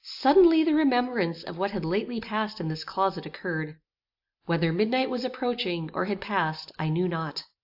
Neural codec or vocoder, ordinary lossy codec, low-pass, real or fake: none; AAC, 48 kbps; 5.4 kHz; real